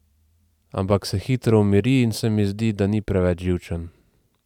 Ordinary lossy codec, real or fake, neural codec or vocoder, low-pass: none; fake; vocoder, 44.1 kHz, 128 mel bands every 512 samples, BigVGAN v2; 19.8 kHz